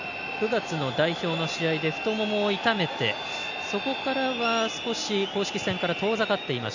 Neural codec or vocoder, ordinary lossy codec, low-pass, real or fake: none; none; 7.2 kHz; real